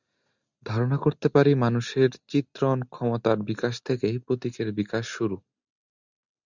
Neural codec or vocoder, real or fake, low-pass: none; real; 7.2 kHz